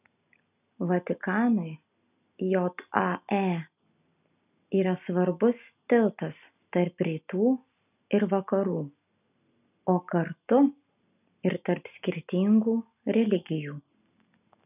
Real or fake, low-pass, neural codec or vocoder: real; 3.6 kHz; none